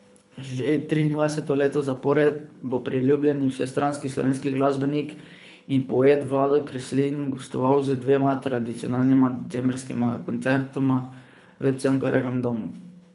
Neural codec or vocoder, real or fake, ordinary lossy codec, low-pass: codec, 24 kHz, 3 kbps, HILCodec; fake; none; 10.8 kHz